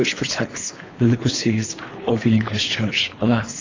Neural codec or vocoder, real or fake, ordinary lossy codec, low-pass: codec, 24 kHz, 3 kbps, HILCodec; fake; AAC, 32 kbps; 7.2 kHz